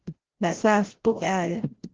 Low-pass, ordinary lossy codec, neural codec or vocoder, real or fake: 7.2 kHz; Opus, 16 kbps; codec, 16 kHz, 0.5 kbps, FreqCodec, larger model; fake